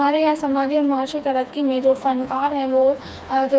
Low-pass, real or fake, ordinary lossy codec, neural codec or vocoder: none; fake; none; codec, 16 kHz, 2 kbps, FreqCodec, smaller model